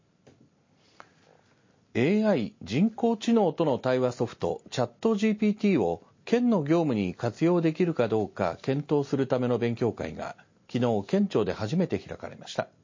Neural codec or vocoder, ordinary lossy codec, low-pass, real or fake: none; MP3, 32 kbps; 7.2 kHz; real